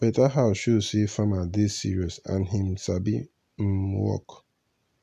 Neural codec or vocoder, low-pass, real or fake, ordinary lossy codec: none; 14.4 kHz; real; AAC, 96 kbps